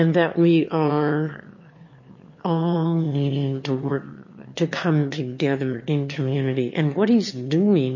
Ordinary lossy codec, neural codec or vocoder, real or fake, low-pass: MP3, 32 kbps; autoencoder, 22.05 kHz, a latent of 192 numbers a frame, VITS, trained on one speaker; fake; 7.2 kHz